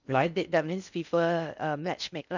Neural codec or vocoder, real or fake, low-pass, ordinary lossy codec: codec, 16 kHz in and 24 kHz out, 0.6 kbps, FocalCodec, streaming, 4096 codes; fake; 7.2 kHz; none